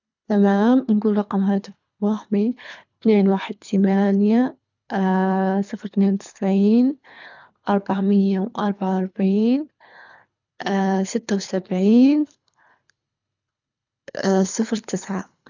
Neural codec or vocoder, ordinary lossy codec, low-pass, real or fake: codec, 24 kHz, 3 kbps, HILCodec; none; 7.2 kHz; fake